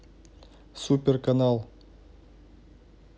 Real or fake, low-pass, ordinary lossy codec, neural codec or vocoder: real; none; none; none